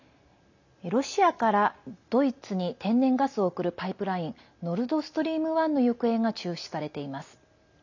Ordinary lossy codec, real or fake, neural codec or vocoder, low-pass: none; real; none; 7.2 kHz